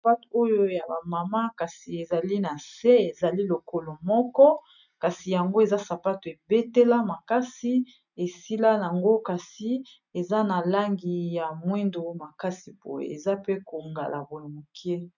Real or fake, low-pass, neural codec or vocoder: real; 7.2 kHz; none